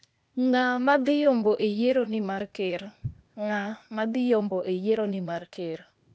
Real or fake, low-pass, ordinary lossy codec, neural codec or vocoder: fake; none; none; codec, 16 kHz, 0.8 kbps, ZipCodec